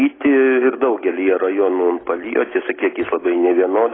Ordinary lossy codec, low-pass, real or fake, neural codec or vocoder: AAC, 16 kbps; 7.2 kHz; real; none